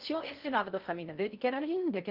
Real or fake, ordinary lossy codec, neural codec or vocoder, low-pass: fake; Opus, 32 kbps; codec, 16 kHz in and 24 kHz out, 0.6 kbps, FocalCodec, streaming, 4096 codes; 5.4 kHz